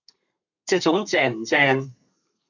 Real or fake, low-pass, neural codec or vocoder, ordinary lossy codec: fake; 7.2 kHz; codec, 32 kHz, 1.9 kbps, SNAC; AAC, 48 kbps